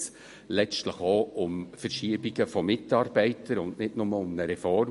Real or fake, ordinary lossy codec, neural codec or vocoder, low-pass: real; MP3, 48 kbps; none; 14.4 kHz